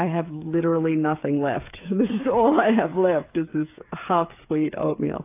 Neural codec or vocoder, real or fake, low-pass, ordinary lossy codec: codec, 16 kHz, 8 kbps, FreqCodec, smaller model; fake; 3.6 kHz; AAC, 24 kbps